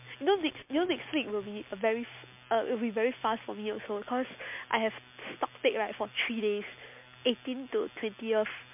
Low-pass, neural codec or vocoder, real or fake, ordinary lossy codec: 3.6 kHz; none; real; MP3, 32 kbps